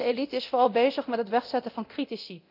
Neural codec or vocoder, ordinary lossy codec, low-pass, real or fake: codec, 24 kHz, 0.9 kbps, DualCodec; none; 5.4 kHz; fake